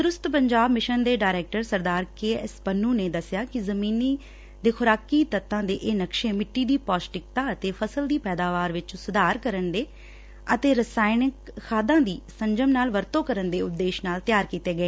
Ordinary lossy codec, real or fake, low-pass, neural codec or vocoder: none; real; none; none